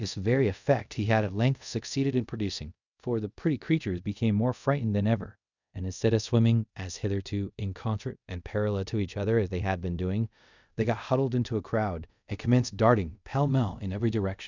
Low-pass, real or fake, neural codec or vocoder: 7.2 kHz; fake; codec, 24 kHz, 0.5 kbps, DualCodec